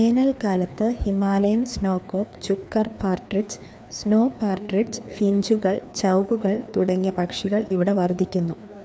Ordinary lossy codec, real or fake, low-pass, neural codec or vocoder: none; fake; none; codec, 16 kHz, 2 kbps, FreqCodec, larger model